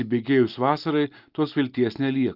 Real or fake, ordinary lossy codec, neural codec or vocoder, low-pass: real; Opus, 24 kbps; none; 5.4 kHz